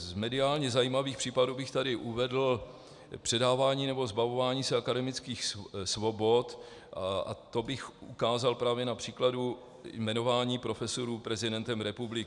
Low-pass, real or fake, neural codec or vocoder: 10.8 kHz; real; none